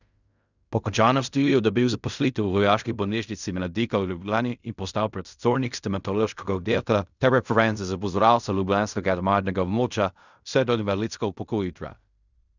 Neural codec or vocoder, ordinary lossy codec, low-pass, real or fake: codec, 16 kHz in and 24 kHz out, 0.4 kbps, LongCat-Audio-Codec, fine tuned four codebook decoder; none; 7.2 kHz; fake